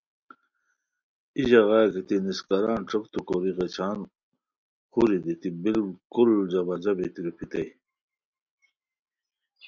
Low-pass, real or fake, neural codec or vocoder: 7.2 kHz; real; none